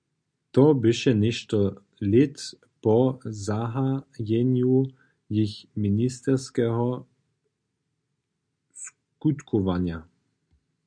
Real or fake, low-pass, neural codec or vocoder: real; 9.9 kHz; none